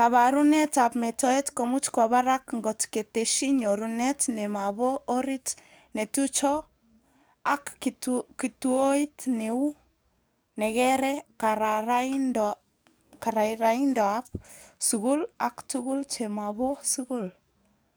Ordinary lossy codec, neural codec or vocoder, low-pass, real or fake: none; codec, 44.1 kHz, 7.8 kbps, DAC; none; fake